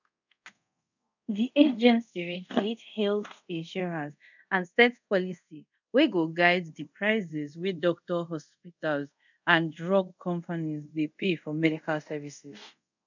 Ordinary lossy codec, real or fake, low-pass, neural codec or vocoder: none; fake; 7.2 kHz; codec, 24 kHz, 0.5 kbps, DualCodec